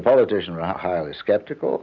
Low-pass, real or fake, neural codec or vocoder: 7.2 kHz; real; none